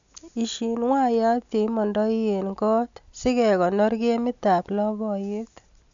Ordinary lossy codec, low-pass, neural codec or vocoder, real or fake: MP3, 64 kbps; 7.2 kHz; none; real